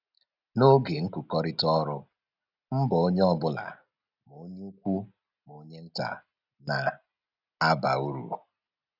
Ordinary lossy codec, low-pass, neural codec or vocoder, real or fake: none; 5.4 kHz; none; real